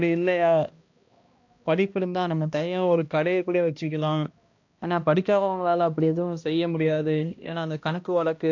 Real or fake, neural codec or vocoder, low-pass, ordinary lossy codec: fake; codec, 16 kHz, 1 kbps, X-Codec, HuBERT features, trained on balanced general audio; 7.2 kHz; AAC, 48 kbps